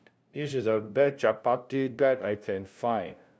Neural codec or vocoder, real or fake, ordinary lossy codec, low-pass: codec, 16 kHz, 0.5 kbps, FunCodec, trained on LibriTTS, 25 frames a second; fake; none; none